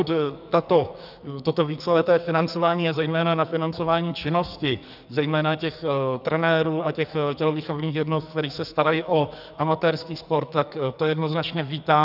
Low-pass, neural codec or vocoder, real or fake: 5.4 kHz; codec, 44.1 kHz, 2.6 kbps, SNAC; fake